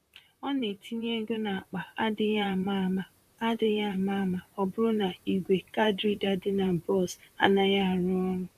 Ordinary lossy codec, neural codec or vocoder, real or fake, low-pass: MP3, 96 kbps; vocoder, 44.1 kHz, 128 mel bands, Pupu-Vocoder; fake; 14.4 kHz